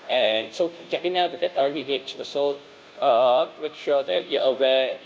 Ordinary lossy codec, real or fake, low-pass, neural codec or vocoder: none; fake; none; codec, 16 kHz, 0.5 kbps, FunCodec, trained on Chinese and English, 25 frames a second